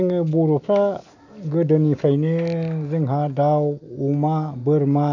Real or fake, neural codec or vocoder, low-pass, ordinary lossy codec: real; none; 7.2 kHz; none